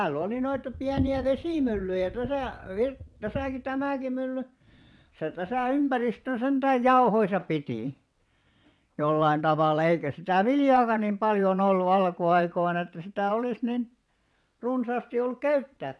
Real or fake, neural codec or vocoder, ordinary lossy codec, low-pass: fake; vocoder, 22.05 kHz, 80 mel bands, WaveNeXt; none; none